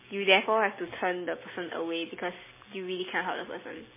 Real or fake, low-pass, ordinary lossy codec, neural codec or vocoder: real; 3.6 kHz; MP3, 16 kbps; none